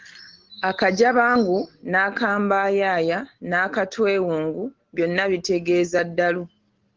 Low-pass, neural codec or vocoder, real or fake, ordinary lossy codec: 7.2 kHz; none; real; Opus, 16 kbps